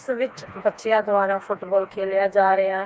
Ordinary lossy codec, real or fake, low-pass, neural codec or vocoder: none; fake; none; codec, 16 kHz, 2 kbps, FreqCodec, smaller model